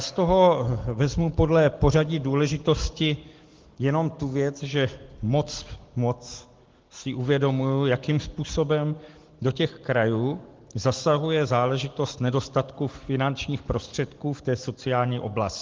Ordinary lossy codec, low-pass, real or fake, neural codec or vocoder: Opus, 16 kbps; 7.2 kHz; real; none